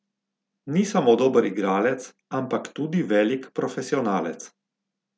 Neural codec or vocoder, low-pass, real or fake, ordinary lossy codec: none; none; real; none